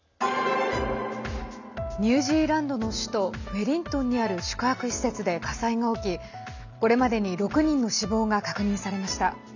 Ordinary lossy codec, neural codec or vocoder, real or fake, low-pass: none; none; real; 7.2 kHz